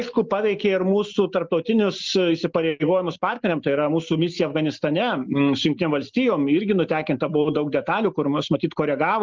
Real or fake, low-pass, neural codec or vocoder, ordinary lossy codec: real; 7.2 kHz; none; Opus, 24 kbps